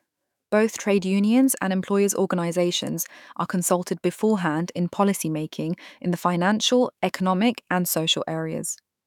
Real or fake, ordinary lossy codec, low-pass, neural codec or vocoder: fake; none; 19.8 kHz; autoencoder, 48 kHz, 128 numbers a frame, DAC-VAE, trained on Japanese speech